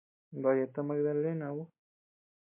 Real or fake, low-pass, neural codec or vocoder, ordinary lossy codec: real; 3.6 kHz; none; MP3, 24 kbps